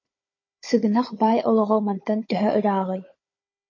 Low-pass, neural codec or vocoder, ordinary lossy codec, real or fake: 7.2 kHz; codec, 16 kHz, 16 kbps, FunCodec, trained on Chinese and English, 50 frames a second; MP3, 32 kbps; fake